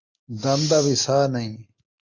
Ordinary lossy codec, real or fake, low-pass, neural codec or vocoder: MP3, 64 kbps; real; 7.2 kHz; none